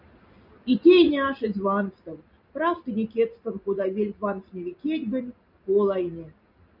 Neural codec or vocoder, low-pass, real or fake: none; 5.4 kHz; real